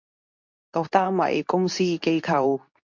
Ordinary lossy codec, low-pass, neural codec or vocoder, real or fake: MP3, 48 kbps; 7.2 kHz; codec, 24 kHz, 0.9 kbps, WavTokenizer, medium speech release version 2; fake